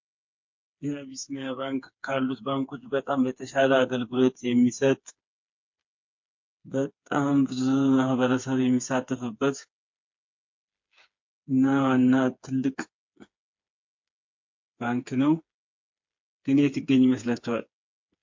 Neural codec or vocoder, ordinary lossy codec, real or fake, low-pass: codec, 16 kHz, 4 kbps, FreqCodec, smaller model; MP3, 48 kbps; fake; 7.2 kHz